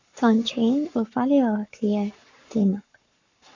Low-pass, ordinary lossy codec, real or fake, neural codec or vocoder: 7.2 kHz; MP3, 64 kbps; fake; codec, 44.1 kHz, 7.8 kbps, Pupu-Codec